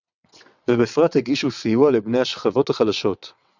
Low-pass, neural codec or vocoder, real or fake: 7.2 kHz; vocoder, 22.05 kHz, 80 mel bands, WaveNeXt; fake